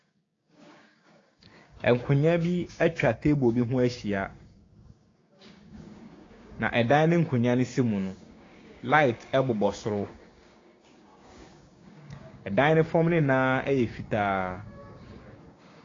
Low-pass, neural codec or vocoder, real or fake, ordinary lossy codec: 7.2 kHz; codec, 16 kHz, 6 kbps, DAC; fake; AAC, 32 kbps